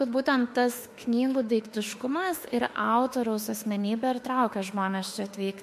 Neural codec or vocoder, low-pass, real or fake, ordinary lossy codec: autoencoder, 48 kHz, 32 numbers a frame, DAC-VAE, trained on Japanese speech; 14.4 kHz; fake; MP3, 64 kbps